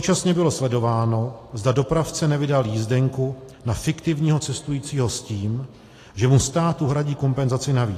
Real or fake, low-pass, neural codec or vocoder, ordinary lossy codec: real; 14.4 kHz; none; AAC, 48 kbps